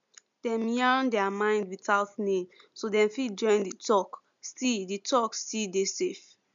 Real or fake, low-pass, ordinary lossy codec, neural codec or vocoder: real; 7.2 kHz; MP3, 64 kbps; none